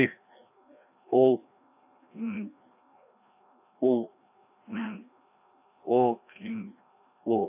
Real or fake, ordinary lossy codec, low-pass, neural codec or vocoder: fake; AAC, 32 kbps; 3.6 kHz; codec, 16 kHz, 1 kbps, FreqCodec, larger model